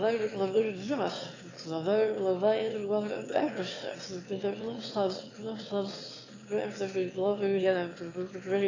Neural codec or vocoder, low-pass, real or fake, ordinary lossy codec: autoencoder, 22.05 kHz, a latent of 192 numbers a frame, VITS, trained on one speaker; 7.2 kHz; fake; AAC, 32 kbps